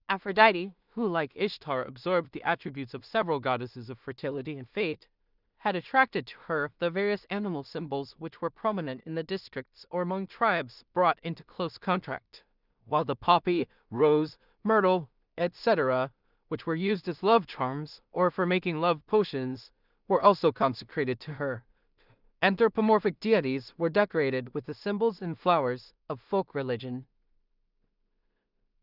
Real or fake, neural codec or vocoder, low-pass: fake; codec, 16 kHz in and 24 kHz out, 0.4 kbps, LongCat-Audio-Codec, two codebook decoder; 5.4 kHz